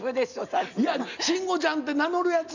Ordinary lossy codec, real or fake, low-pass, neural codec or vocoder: none; real; 7.2 kHz; none